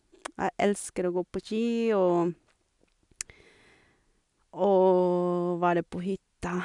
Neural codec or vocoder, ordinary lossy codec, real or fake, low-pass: none; none; real; 10.8 kHz